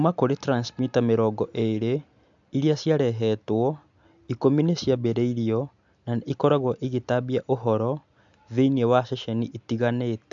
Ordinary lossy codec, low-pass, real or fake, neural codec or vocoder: AAC, 64 kbps; 7.2 kHz; real; none